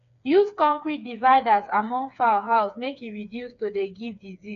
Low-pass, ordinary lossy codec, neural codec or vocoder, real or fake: 7.2 kHz; none; codec, 16 kHz, 4 kbps, FreqCodec, smaller model; fake